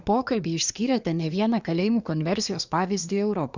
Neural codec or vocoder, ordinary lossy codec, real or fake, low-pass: codec, 24 kHz, 1 kbps, SNAC; Opus, 64 kbps; fake; 7.2 kHz